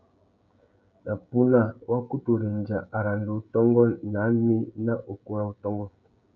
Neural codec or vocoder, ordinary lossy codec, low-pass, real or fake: codec, 16 kHz, 16 kbps, FreqCodec, smaller model; MP3, 96 kbps; 7.2 kHz; fake